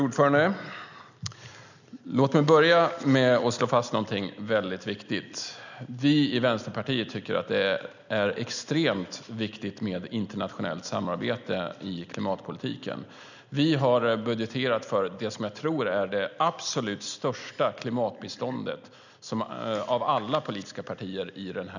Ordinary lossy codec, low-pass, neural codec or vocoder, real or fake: none; 7.2 kHz; none; real